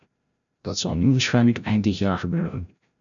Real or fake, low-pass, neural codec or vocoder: fake; 7.2 kHz; codec, 16 kHz, 0.5 kbps, FreqCodec, larger model